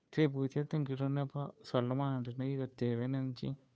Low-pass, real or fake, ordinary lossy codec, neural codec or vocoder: none; fake; none; codec, 16 kHz, 2 kbps, FunCodec, trained on Chinese and English, 25 frames a second